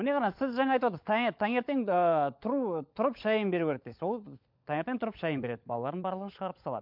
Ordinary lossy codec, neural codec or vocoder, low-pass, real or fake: MP3, 48 kbps; codec, 16 kHz, 16 kbps, FunCodec, trained on LibriTTS, 50 frames a second; 5.4 kHz; fake